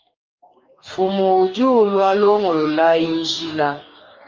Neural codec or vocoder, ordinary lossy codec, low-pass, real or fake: codec, 44.1 kHz, 2.6 kbps, DAC; Opus, 32 kbps; 7.2 kHz; fake